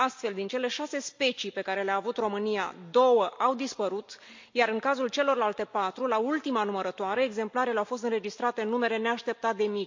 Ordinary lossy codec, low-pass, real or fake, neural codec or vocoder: MP3, 48 kbps; 7.2 kHz; real; none